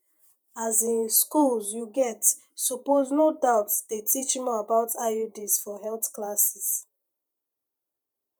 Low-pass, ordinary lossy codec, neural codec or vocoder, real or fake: none; none; vocoder, 48 kHz, 128 mel bands, Vocos; fake